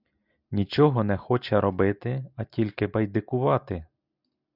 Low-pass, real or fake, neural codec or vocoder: 5.4 kHz; real; none